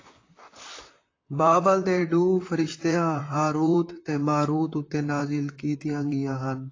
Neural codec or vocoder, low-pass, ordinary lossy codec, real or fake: vocoder, 44.1 kHz, 128 mel bands, Pupu-Vocoder; 7.2 kHz; AAC, 32 kbps; fake